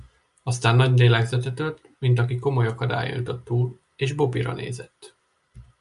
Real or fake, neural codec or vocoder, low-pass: real; none; 10.8 kHz